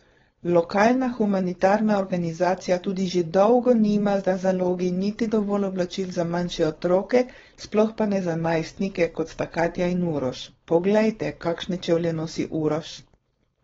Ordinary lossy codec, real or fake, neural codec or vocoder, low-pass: AAC, 24 kbps; fake; codec, 16 kHz, 4.8 kbps, FACodec; 7.2 kHz